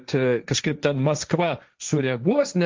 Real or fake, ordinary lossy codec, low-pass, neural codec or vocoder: fake; Opus, 32 kbps; 7.2 kHz; codec, 16 kHz, 1.1 kbps, Voila-Tokenizer